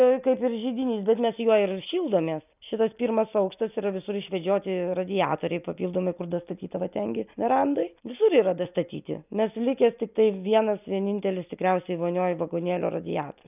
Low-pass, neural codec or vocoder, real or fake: 3.6 kHz; none; real